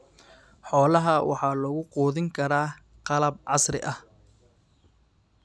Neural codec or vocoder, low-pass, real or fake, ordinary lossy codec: none; none; real; none